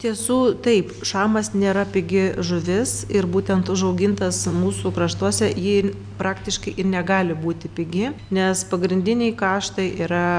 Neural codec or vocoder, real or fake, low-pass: none; real; 9.9 kHz